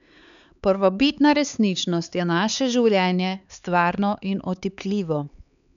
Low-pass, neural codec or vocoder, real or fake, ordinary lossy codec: 7.2 kHz; codec, 16 kHz, 4 kbps, X-Codec, HuBERT features, trained on LibriSpeech; fake; none